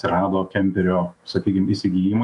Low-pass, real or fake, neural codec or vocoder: 10.8 kHz; fake; autoencoder, 48 kHz, 128 numbers a frame, DAC-VAE, trained on Japanese speech